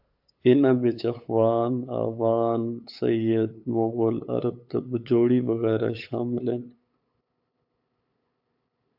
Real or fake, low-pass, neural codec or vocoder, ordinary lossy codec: fake; 5.4 kHz; codec, 16 kHz, 8 kbps, FunCodec, trained on LibriTTS, 25 frames a second; AAC, 48 kbps